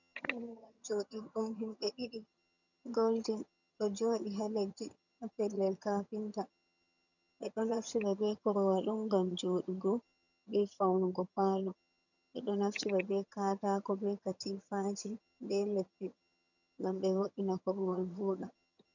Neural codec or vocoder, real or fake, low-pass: vocoder, 22.05 kHz, 80 mel bands, HiFi-GAN; fake; 7.2 kHz